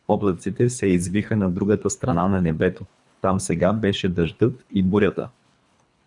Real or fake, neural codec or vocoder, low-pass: fake; codec, 24 kHz, 3 kbps, HILCodec; 10.8 kHz